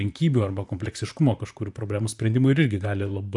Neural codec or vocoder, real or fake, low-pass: vocoder, 44.1 kHz, 128 mel bands, Pupu-Vocoder; fake; 10.8 kHz